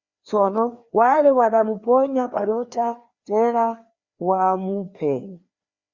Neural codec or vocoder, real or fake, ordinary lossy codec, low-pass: codec, 16 kHz, 2 kbps, FreqCodec, larger model; fake; Opus, 64 kbps; 7.2 kHz